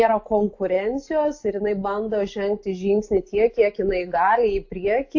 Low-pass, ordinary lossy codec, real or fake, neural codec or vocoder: 7.2 kHz; AAC, 48 kbps; real; none